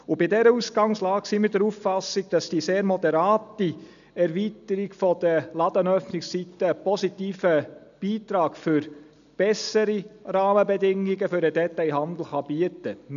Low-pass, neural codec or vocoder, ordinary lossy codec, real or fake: 7.2 kHz; none; none; real